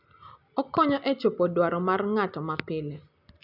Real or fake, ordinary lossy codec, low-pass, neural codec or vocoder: real; none; 5.4 kHz; none